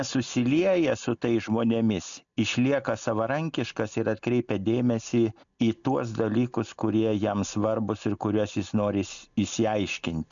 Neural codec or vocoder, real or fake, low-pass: none; real; 7.2 kHz